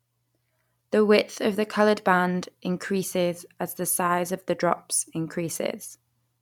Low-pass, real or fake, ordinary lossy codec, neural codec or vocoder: 19.8 kHz; fake; none; vocoder, 44.1 kHz, 128 mel bands every 256 samples, BigVGAN v2